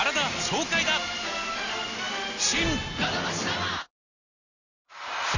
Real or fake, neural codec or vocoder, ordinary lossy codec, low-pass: real; none; none; 7.2 kHz